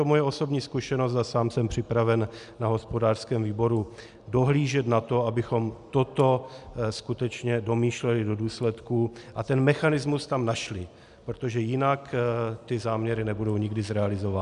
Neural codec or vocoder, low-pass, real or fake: none; 10.8 kHz; real